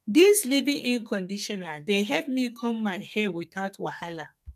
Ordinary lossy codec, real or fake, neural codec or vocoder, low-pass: none; fake; codec, 32 kHz, 1.9 kbps, SNAC; 14.4 kHz